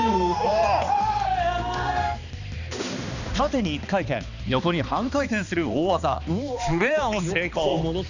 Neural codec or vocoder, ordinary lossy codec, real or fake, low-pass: codec, 16 kHz, 2 kbps, X-Codec, HuBERT features, trained on balanced general audio; none; fake; 7.2 kHz